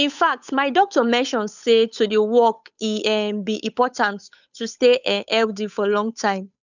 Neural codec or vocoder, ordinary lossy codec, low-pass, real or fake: codec, 16 kHz, 8 kbps, FunCodec, trained on Chinese and English, 25 frames a second; none; 7.2 kHz; fake